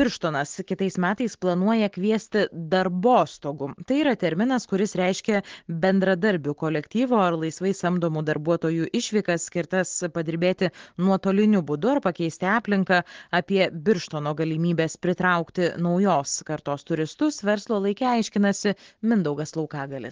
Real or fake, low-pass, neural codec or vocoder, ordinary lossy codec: real; 7.2 kHz; none; Opus, 16 kbps